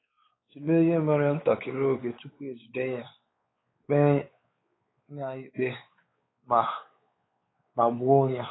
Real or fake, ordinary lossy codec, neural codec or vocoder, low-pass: fake; AAC, 16 kbps; codec, 16 kHz, 4 kbps, X-Codec, WavLM features, trained on Multilingual LibriSpeech; 7.2 kHz